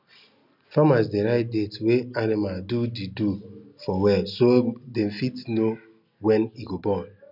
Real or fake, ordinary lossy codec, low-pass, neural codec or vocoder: real; none; 5.4 kHz; none